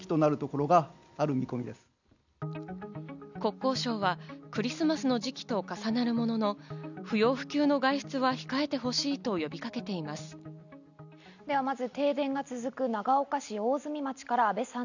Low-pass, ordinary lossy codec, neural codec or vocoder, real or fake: 7.2 kHz; none; none; real